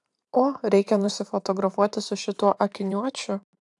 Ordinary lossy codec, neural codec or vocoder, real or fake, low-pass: AAC, 64 kbps; vocoder, 44.1 kHz, 128 mel bands every 512 samples, BigVGAN v2; fake; 10.8 kHz